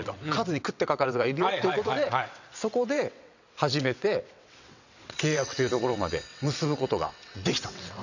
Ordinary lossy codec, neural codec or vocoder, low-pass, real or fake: none; vocoder, 22.05 kHz, 80 mel bands, WaveNeXt; 7.2 kHz; fake